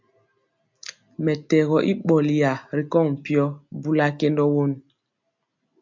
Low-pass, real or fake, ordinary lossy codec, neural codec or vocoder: 7.2 kHz; real; MP3, 64 kbps; none